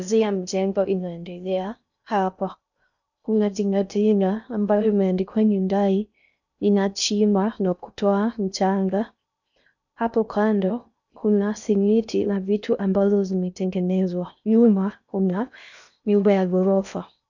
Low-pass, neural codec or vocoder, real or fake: 7.2 kHz; codec, 16 kHz in and 24 kHz out, 0.6 kbps, FocalCodec, streaming, 4096 codes; fake